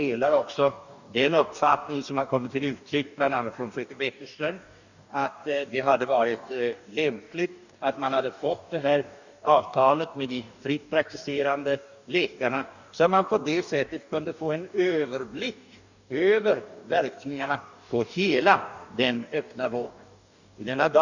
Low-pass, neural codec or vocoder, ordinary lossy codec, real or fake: 7.2 kHz; codec, 44.1 kHz, 2.6 kbps, DAC; none; fake